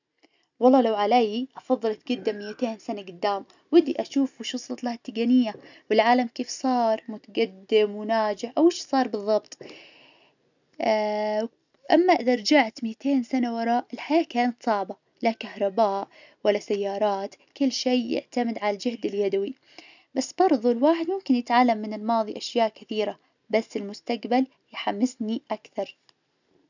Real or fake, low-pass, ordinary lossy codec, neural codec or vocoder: real; 7.2 kHz; none; none